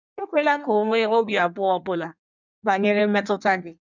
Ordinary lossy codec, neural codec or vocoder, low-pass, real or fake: none; codec, 16 kHz in and 24 kHz out, 1.1 kbps, FireRedTTS-2 codec; 7.2 kHz; fake